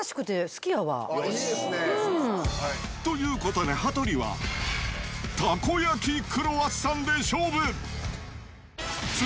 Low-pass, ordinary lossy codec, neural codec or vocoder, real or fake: none; none; none; real